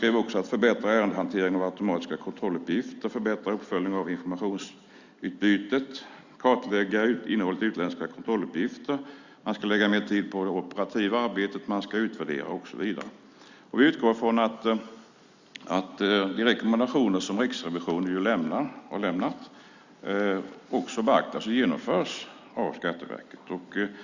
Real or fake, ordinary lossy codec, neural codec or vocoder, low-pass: real; Opus, 64 kbps; none; 7.2 kHz